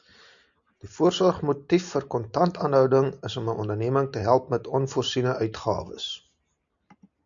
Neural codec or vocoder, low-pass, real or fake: none; 7.2 kHz; real